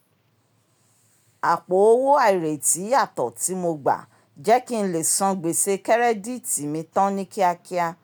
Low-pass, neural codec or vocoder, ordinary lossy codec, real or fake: none; none; none; real